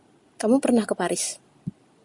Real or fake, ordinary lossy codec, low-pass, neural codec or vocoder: real; Opus, 64 kbps; 10.8 kHz; none